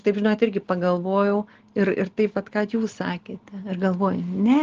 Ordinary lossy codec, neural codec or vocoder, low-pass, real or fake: Opus, 16 kbps; none; 7.2 kHz; real